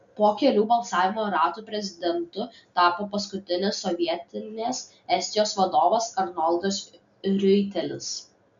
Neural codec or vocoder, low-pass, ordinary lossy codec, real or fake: none; 7.2 kHz; MP3, 64 kbps; real